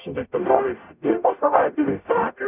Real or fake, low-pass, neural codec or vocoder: fake; 3.6 kHz; codec, 44.1 kHz, 0.9 kbps, DAC